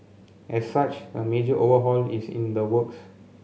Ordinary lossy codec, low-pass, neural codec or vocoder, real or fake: none; none; none; real